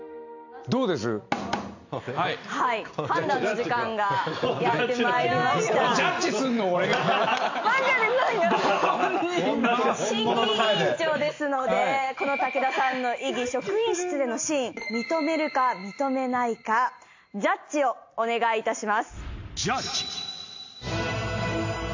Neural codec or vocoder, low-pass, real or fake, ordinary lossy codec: none; 7.2 kHz; real; AAC, 48 kbps